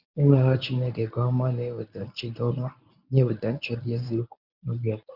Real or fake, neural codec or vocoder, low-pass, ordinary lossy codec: fake; codec, 24 kHz, 0.9 kbps, WavTokenizer, medium speech release version 1; 5.4 kHz; none